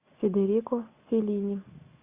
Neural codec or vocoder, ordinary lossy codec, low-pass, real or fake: none; Opus, 64 kbps; 3.6 kHz; real